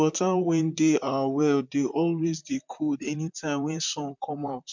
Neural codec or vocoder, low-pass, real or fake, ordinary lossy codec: vocoder, 44.1 kHz, 128 mel bands, Pupu-Vocoder; 7.2 kHz; fake; MP3, 64 kbps